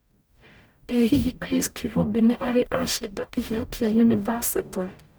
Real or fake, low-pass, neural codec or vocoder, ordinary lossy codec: fake; none; codec, 44.1 kHz, 0.9 kbps, DAC; none